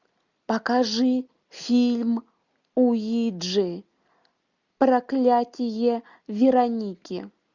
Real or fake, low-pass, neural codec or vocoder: real; 7.2 kHz; none